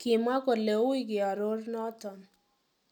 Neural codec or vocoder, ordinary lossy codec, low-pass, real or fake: none; none; 19.8 kHz; real